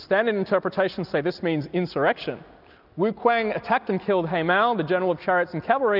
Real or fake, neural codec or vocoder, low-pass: real; none; 5.4 kHz